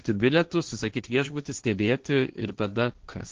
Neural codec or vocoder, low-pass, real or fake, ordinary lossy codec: codec, 16 kHz, 1.1 kbps, Voila-Tokenizer; 7.2 kHz; fake; Opus, 16 kbps